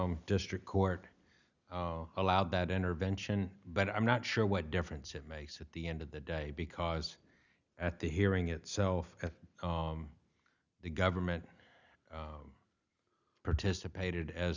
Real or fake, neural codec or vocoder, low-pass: real; none; 7.2 kHz